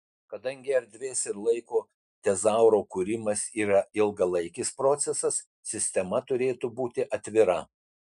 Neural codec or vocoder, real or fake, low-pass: none; real; 10.8 kHz